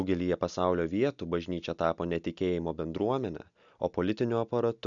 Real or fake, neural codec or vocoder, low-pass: real; none; 7.2 kHz